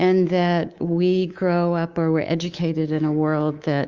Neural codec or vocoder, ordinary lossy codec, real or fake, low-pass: codec, 24 kHz, 3.1 kbps, DualCodec; Opus, 32 kbps; fake; 7.2 kHz